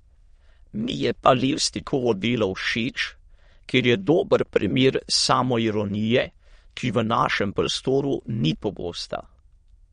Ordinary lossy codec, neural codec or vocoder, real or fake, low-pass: MP3, 48 kbps; autoencoder, 22.05 kHz, a latent of 192 numbers a frame, VITS, trained on many speakers; fake; 9.9 kHz